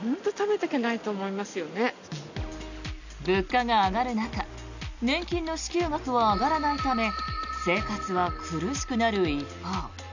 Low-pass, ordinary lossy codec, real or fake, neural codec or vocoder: 7.2 kHz; none; real; none